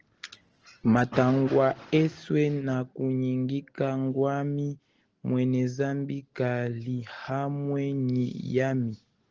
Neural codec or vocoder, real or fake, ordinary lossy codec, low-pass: none; real; Opus, 16 kbps; 7.2 kHz